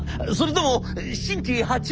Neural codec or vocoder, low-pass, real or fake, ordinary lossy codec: none; none; real; none